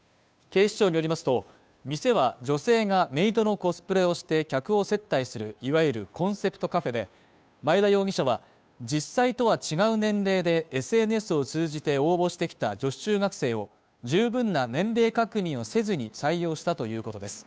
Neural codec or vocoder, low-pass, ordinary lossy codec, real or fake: codec, 16 kHz, 2 kbps, FunCodec, trained on Chinese and English, 25 frames a second; none; none; fake